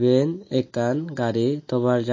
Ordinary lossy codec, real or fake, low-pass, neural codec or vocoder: MP3, 32 kbps; real; 7.2 kHz; none